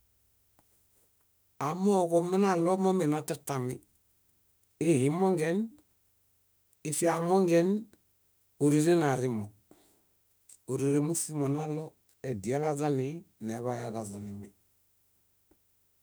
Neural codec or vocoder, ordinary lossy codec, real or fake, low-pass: autoencoder, 48 kHz, 32 numbers a frame, DAC-VAE, trained on Japanese speech; none; fake; none